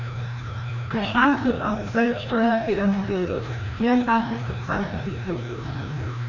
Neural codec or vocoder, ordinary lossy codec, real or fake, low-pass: codec, 16 kHz, 1 kbps, FreqCodec, larger model; none; fake; 7.2 kHz